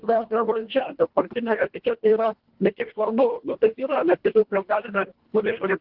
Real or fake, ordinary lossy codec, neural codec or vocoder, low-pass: fake; Opus, 16 kbps; codec, 24 kHz, 1.5 kbps, HILCodec; 5.4 kHz